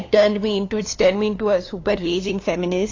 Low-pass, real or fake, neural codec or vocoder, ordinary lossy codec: 7.2 kHz; fake; codec, 16 kHz, 2 kbps, FunCodec, trained on LibriTTS, 25 frames a second; AAC, 32 kbps